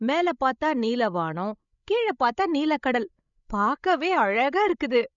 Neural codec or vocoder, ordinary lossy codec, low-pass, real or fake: codec, 16 kHz, 16 kbps, FreqCodec, larger model; none; 7.2 kHz; fake